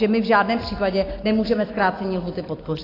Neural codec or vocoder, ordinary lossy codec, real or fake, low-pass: none; AAC, 24 kbps; real; 5.4 kHz